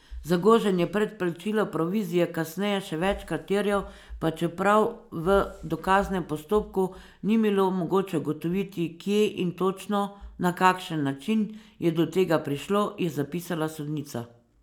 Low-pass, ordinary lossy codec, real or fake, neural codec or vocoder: 19.8 kHz; none; real; none